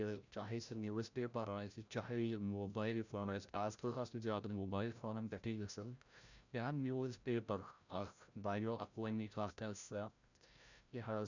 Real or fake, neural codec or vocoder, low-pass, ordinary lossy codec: fake; codec, 16 kHz, 0.5 kbps, FreqCodec, larger model; 7.2 kHz; none